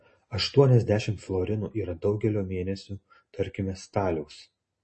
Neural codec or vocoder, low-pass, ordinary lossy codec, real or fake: none; 10.8 kHz; MP3, 32 kbps; real